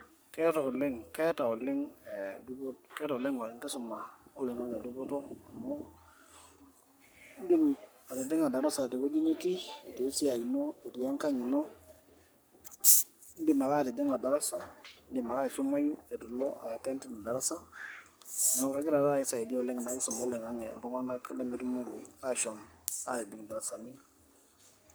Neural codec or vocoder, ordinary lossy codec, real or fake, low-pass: codec, 44.1 kHz, 3.4 kbps, Pupu-Codec; none; fake; none